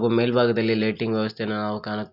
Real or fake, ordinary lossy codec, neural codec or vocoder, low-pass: real; none; none; 5.4 kHz